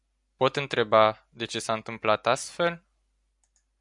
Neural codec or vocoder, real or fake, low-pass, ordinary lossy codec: none; real; 10.8 kHz; MP3, 96 kbps